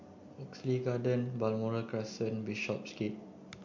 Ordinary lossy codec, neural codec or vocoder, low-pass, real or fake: MP3, 48 kbps; none; 7.2 kHz; real